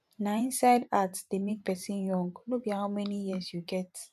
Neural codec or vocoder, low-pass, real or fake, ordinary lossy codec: vocoder, 44.1 kHz, 128 mel bands every 512 samples, BigVGAN v2; 14.4 kHz; fake; none